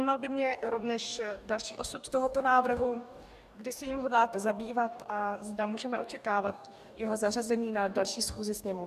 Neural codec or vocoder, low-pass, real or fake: codec, 44.1 kHz, 2.6 kbps, DAC; 14.4 kHz; fake